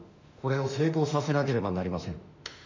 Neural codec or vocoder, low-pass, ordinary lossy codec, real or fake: autoencoder, 48 kHz, 32 numbers a frame, DAC-VAE, trained on Japanese speech; 7.2 kHz; MP3, 64 kbps; fake